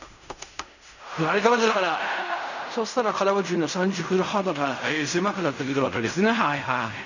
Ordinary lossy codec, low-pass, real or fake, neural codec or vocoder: none; 7.2 kHz; fake; codec, 16 kHz in and 24 kHz out, 0.4 kbps, LongCat-Audio-Codec, fine tuned four codebook decoder